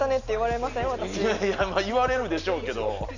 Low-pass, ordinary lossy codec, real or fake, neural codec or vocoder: 7.2 kHz; none; real; none